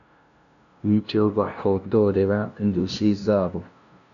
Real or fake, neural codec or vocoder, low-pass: fake; codec, 16 kHz, 0.5 kbps, FunCodec, trained on LibriTTS, 25 frames a second; 7.2 kHz